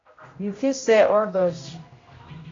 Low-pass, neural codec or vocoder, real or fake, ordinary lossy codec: 7.2 kHz; codec, 16 kHz, 0.5 kbps, X-Codec, HuBERT features, trained on general audio; fake; AAC, 32 kbps